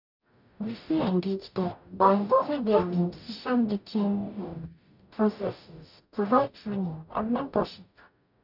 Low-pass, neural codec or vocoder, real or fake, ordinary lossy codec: 5.4 kHz; codec, 44.1 kHz, 0.9 kbps, DAC; fake; none